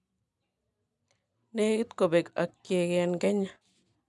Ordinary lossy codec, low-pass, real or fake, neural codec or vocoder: none; none; real; none